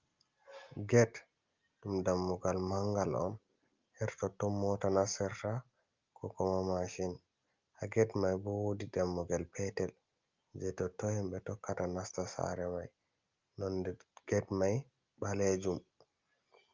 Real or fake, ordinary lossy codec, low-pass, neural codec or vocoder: real; Opus, 24 kbps; 7.2 kHz; none